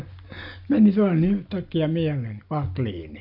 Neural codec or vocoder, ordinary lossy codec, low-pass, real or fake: none; none; 5.4 kHz; real